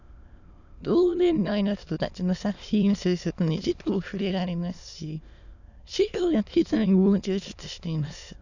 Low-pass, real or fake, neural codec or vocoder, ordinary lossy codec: 7.2 kHz; fake; autoencoder, 22.05 kHz, a latent of 192 numbers a frame, VITS, trained on many speakers; none